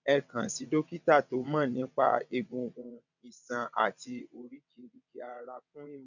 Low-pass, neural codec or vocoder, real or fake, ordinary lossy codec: 7.2 kHz; vocoder, 22.05 kHz, 80 mel bands, WaveNeXt; fake; none